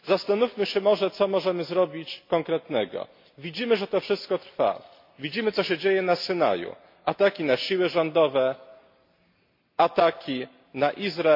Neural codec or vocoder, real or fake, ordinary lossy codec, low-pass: none; real; MP3, 32 kbps; 5.4 kHz